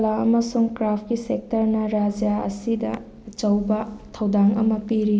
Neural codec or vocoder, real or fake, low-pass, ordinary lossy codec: none; real; none; none